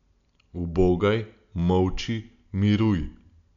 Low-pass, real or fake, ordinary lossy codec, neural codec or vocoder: 7.2 kHz; real; none; none